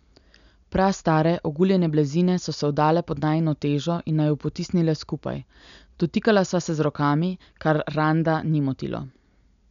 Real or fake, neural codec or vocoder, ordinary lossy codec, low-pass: real; none; none; 7.2 kHz